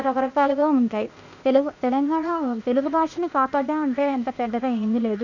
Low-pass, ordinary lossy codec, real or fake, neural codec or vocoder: 7.2 kHz; none; fake; codec, 16 kHz, 0.8 kbps, ZipCodec